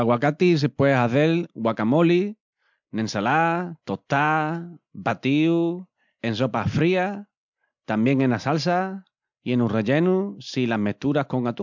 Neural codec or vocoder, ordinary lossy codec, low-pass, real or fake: none; MP3, 64 kbps; 7.2 kHz; real